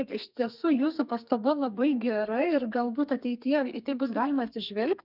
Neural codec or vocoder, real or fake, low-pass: codec, 32 kHz, 1.9 kbps, SNAC; fake; 5.4 kHz